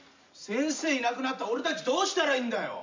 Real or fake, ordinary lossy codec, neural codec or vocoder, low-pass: real; MP3, 64 kbps; none; 7.2 kHz